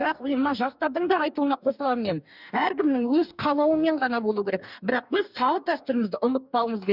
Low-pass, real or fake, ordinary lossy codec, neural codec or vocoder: 5.4 kHz; fake; none; codec, 44.1 kHz, 2.6 kbps, DAC